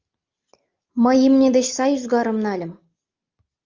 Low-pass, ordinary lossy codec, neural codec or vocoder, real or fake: 7.2 kHz; Opus, 24 kbps; none; real